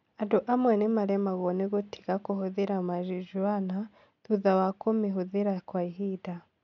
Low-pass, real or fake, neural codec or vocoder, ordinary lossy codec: 7.2 kHz; real; none; none